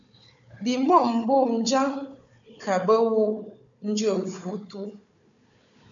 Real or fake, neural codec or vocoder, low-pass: fake; codec, 16 kHz, 4 kbps, FunCodec, trained on Chinese and English, 50 frames a second; 7.2 kHz